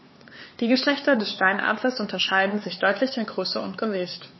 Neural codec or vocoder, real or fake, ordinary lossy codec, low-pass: codec, 16 kHz, 4 kbps, X-Codec, HuBERT features, trained on LibriSpeech; fake; MP3, 24 kbps; 7.2 kHz